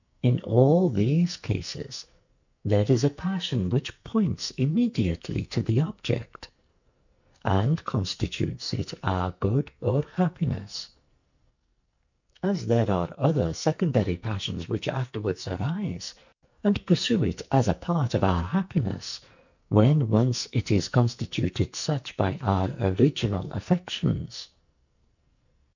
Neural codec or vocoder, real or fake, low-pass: codec, 44.1 kHz, 2.6 kbps, SNAC; fake; 7.2 kHz